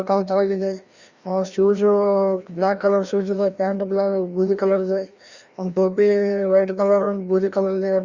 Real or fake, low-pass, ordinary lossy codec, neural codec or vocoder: fake; 7.2 kHz; Opus, 64 kbps; codec, 16 kHz, 1 kbps, FreqCodec, larger model